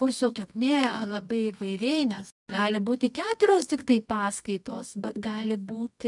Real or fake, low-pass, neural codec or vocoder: fake; 10.8 kHz; codec, 24 kHz, 0.9 kbps, WavTokenizer, medium music audio release